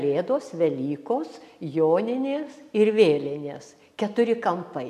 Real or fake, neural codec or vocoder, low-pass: real; none; 14.4 kHz